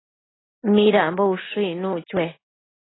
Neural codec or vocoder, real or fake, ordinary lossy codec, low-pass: none; real; AAC, 16 kbps; 7.2 kHz